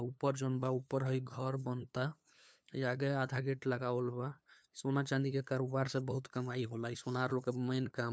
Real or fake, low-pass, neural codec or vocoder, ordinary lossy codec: fake; none; codec, 16 kHz, 2 kbps, FunCodec, trained on LibriTTS, 25 frames a second; none